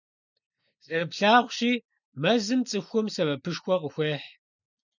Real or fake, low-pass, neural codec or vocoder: real; 7.2 kHz; none